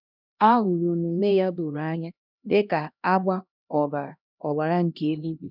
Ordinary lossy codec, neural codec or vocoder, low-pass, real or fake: none; codec, 16 kHz, 1 kbps, X-Codec, HuBERT features, trained on LibriSpeech; 5.4 kHz; fake